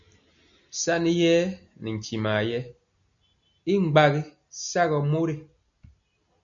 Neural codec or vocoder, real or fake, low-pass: none; real; 7.2 kHz